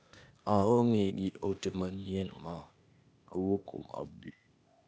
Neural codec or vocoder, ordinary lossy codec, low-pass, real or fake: codec, 16 kHz, 0.8 kbps, ZipCodec; none; none; fake